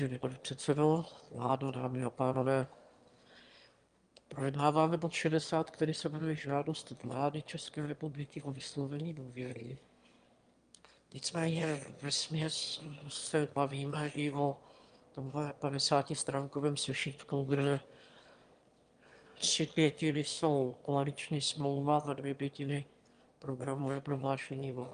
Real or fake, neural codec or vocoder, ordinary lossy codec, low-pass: fake; autoencoder, 22.05 kHz, a latent of 192 numbers a frame, VITS, trained on one speaker; Opus, 32 kbps; 9.9 kHz